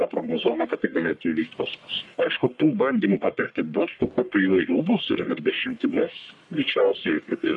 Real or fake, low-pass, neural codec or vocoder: fake; 10.8 kHz; codec, 44.1 kHz, 1.7 kbps, Pupu-Codec